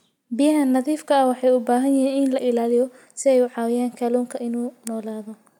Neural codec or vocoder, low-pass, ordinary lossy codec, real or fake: none; 19.8 kHz; none; real